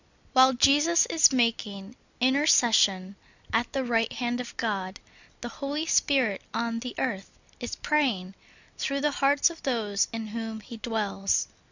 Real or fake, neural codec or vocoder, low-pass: real; none; 7.2 kHz